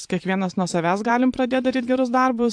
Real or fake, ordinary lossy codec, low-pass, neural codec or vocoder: fake; AAC, 64 kbps; 9.9 kHz; vocoder, 22.05 kHz, 80 mel bands, Vocos